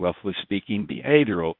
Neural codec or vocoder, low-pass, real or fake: codec, 24 kHz, 0.9 kbps, WavTokenizer, medium speech release version 1; 5.4 kHz; fake